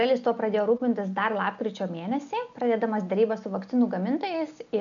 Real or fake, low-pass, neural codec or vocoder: real; 7.2 kHz; none